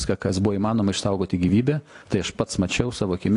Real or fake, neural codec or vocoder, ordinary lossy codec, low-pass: real; none; AAC, 48 kbps; 10.8 kHz